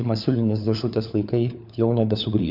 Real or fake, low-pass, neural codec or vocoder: fake; 5.4 kHz; codec, 16 kHz, 4 kbps, FunCodec, trained on LibriTTS, 50 frames a second